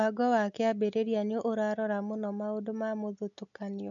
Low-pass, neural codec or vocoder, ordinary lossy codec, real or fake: 7.2 kHz; none; none; real